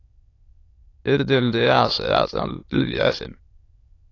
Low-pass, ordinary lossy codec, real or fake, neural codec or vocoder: 7.2 kHz; AAC, 32 kbps; fake; autoencoder, 22.05 kHz, a latent of 192 numbers a frame, VITS, trained on many speakers